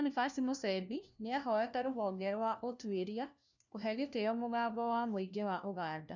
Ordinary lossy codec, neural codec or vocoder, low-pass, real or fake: none; codec, 16 kHz, 1 kbps, FunCodec, trained on LibriTTS, 50 frames a second; 7.2 kHz; fake